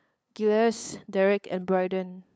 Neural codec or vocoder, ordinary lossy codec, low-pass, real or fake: codec, 16 kHz, 8 kbps, FunCodec, trained on LibriTTS, 25 frames a second; none; none; fake